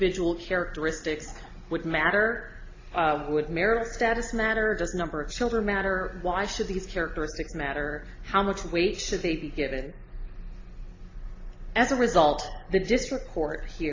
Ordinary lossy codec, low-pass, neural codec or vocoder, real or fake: AAC, 32 kbps; 7.2 kHz; none; real